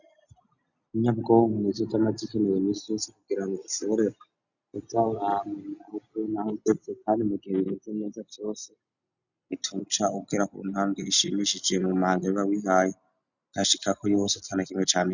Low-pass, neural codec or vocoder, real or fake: 7.2 kHz; none; real